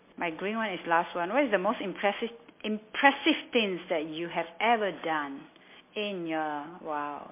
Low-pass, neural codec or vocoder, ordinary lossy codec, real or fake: 3.6 kHz; none; MP3, 24 kbps; real